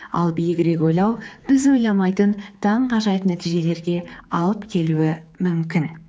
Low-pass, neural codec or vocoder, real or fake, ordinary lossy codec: none; codec, 16 kHz, 4 kbps, X-Codec, HuBERT features, trained on general audio; fake; none